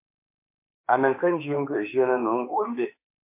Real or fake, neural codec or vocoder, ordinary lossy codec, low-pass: fake; autoencoder, 48 kHz, 32 numbers a frame, DAC-VAE, trained on Japanese speech; MP3, 24 kbps; 3.6 kHz